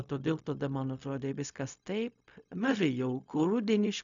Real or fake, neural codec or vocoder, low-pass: fake; codec, 16 kHz, 0.4 kbps, LongCat-Audio-Codec; 7.2 kHz